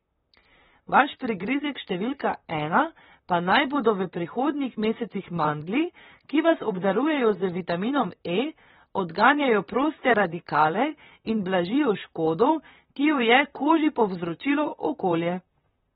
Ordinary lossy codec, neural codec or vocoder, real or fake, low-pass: AAC, 16 kbps; vocoder, 44.1 kHz, 128 mel bands, Pupu-Vocoder; fake; 19.8 kHz